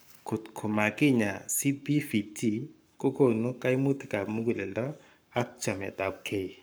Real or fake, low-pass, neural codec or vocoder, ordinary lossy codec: fake; none; codec, 44.1 kHz, 7.8 kbps, Pupu-Codec; none